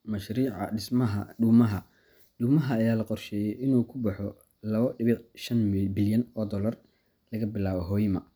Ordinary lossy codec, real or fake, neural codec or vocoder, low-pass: none; real; none; none